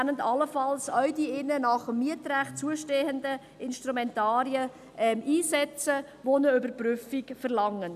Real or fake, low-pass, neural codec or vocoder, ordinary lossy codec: real; 14.4 kHz; none; none